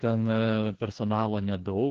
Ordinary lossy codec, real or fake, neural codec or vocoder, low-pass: Opus, 16 kbps; fake; codec, 16 kHz, 2 kbps, FreqCodec, larger model; 7.2 kHz